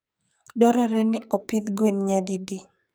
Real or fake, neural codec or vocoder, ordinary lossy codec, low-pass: fake; codec, 44.1 kHz, 2.6 kbps, SNAC; none; none